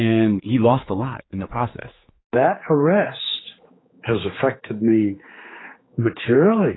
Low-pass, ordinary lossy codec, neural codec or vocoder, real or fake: 7.2 kHz; AAC, 16 kbps; codec, 16 kHz, 4 kbps, X-Codec, HuBERT features, trained on general audio; fake